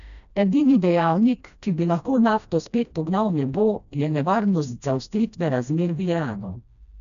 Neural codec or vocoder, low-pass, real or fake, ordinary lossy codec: codec, 16 kHz, 1 kbps, FreqCodec, smaller model; 7.2 kHz; fake; none